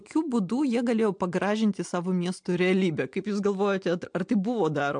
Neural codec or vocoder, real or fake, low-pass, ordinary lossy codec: none; real; 9.9 kHz; MP3, 64 kbps